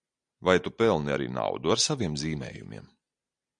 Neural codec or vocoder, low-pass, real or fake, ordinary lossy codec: none; 9.9 kHz; real; MP3, 64 kbps